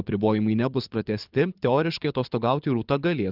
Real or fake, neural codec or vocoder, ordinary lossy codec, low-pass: fake; codec, 24 kHz, 6 kbps, HILCodec; Opus, 32 kbps; 5.4 kHz